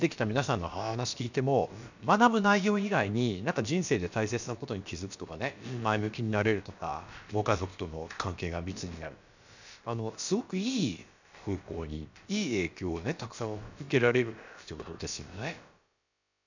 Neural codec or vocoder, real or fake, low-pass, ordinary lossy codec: codec, 16 kHz, about 1 kbps, DyCAST, with the encoder's durations; fake; 7.2 kHz; none